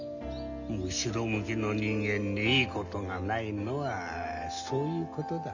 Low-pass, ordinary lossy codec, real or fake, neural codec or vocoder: 7.2 kHz; none; real; none